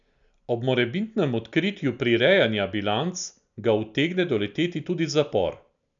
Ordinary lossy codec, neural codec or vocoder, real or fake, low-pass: none; none; real; 7.2 kHz